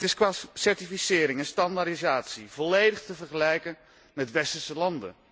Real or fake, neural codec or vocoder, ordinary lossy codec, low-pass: real; none; none; none